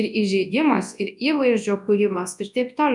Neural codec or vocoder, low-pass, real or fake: codec, 24 kHz, 0.9 kbps, WavTokenizer, large speech release; 10.8 kHz; fake